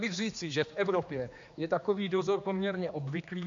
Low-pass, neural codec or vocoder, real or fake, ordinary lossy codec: 7.2 kHz; codec, 16 kHz, 2 kbps, X-Codec, HuBERT features, trained on general audio; fake; MP3, 64 kbps